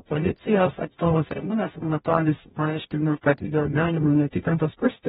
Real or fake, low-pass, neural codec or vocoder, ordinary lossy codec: fake; 19.8 kHz; codec, 44.1 kHz, 0.9 kbps, DAC; AAC, 16 kbps